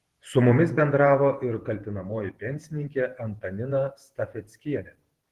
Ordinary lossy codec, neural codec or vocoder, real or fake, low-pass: Opus, 16 kbps; vocoder, 48 kHz, 128 mel bands, Vocos; fake; 14.4 kHz